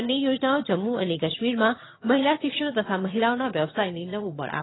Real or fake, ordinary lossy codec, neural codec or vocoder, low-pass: fake; AAC, 16 kbps; vocoder, 22.05 kHz, 80 mel bands, HiFi-GAN; 7.2 kHz